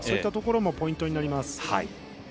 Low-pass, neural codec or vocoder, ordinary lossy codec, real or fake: none; none; none; real